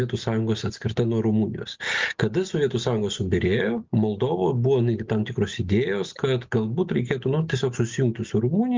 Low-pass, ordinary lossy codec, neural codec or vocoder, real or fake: 7.2 kHz; Opus, 32 kbps; none; real